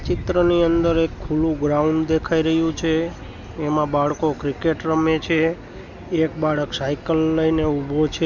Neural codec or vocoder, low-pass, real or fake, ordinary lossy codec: none; 7.2 kHz; real; none